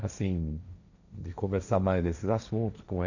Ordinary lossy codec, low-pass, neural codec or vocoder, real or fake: none; 7.2 kHz; codec, 16 kHz, 1.1 kbps, Voila-Tokenizer; fake